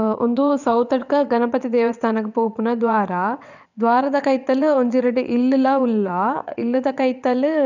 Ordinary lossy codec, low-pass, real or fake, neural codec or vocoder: none; 7.2 kHz; fake; vocoder, 22.05 kHz, 80 mel bands, WaveNeXt